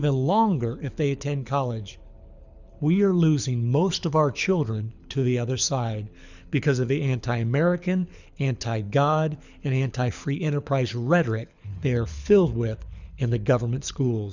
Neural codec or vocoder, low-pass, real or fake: codec, 24 kHz, 6 kbps, HILCodec; 7.2 kHz; fake